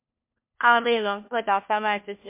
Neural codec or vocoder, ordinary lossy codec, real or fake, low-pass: codec, 16 kHz, 1 kbps, FunCodec, trained on LibriTTS, 50 frames a second; MP3, 32 kbps; fake; 3.6 kHz